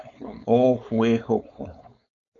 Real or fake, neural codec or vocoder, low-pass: fake; codec, 16 kHz, 4.8 kbps, FACodec; 7.2 kHz